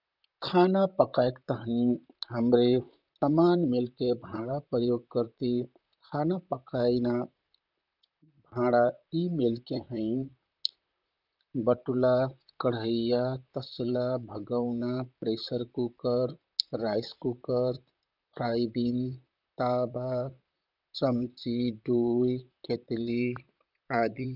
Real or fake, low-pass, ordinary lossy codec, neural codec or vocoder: fake; 5.4 kHz; none; vocoder, 44.1 kHz, 128 mel bands, Pupu-Vocoder